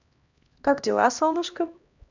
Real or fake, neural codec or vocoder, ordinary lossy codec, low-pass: fake; codec, 16 kHz, 1 kbps, X-Codec, HuBERT features, trained on LibriSpeech; none; 7.2 kHz